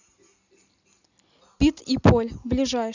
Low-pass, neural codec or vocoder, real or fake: 7.2 kHz; none; real